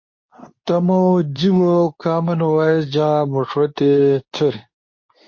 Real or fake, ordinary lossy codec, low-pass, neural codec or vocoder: fake; MP3, 32 kbps; 7.2 kHz; codec, 24 kHz, 0.9 kbps, WavTokenizer, medium speech release version 2